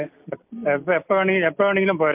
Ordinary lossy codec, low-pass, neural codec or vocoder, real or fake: MP3, 32 kbps; 3.6 kHz; none; real